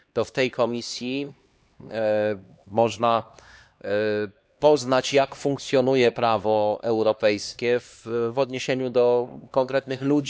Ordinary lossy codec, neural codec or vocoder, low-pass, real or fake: none; codec, 16 kHz, 2 kbps, X-Codec, HuBERT features, trained on LibriSpeech; none; fake